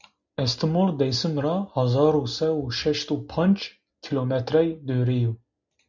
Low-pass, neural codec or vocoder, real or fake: 7.2 kHz; none; real